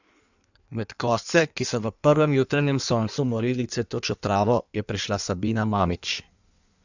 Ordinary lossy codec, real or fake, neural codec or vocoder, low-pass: none; fake; codec, 16 kHz in and 24 kHz out, 1.1 kbps, FireRedTTS-2 codec; 7.2 kHz